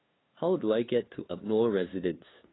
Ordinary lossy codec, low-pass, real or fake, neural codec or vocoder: AAC, 16 kbps; 7.2 kHz; fake; codec, 16 kHz, 2 kbps, FunCodec, trained on LibriTTS, 25 frames a second